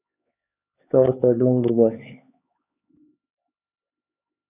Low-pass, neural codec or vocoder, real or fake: 3.6 kHz; codec, 16 kHz, 4 kbps, X-Codec, HuBERT features, trained on LibriSpeech; fake